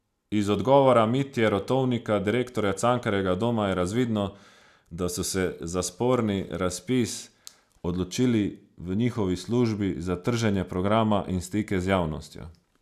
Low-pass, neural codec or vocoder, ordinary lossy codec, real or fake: 14.4 kHz; none; none; real